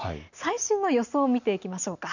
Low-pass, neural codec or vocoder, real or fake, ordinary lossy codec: 7.2 kHz; none; real; none